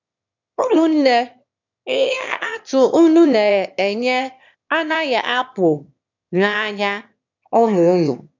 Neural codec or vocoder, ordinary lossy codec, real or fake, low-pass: autoencoder, 22.05 kHz, a latent of 192 numbers a frame, VITS, trained on one speaker; none; fake; 7.2 kHz